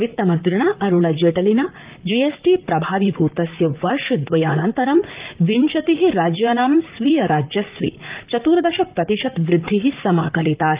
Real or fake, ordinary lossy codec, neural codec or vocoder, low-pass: fake; Opus, 32 kbps; vocoder, 44.1 kHz, 128 mel bands, Pupu-Vocoder; 3.6 kHz